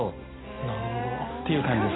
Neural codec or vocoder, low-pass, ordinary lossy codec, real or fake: none; 7.2 kHz; AAC, 16 kbps; real